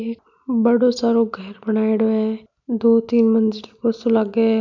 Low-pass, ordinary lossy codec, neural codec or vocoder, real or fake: 7.2 kHz; none; none; real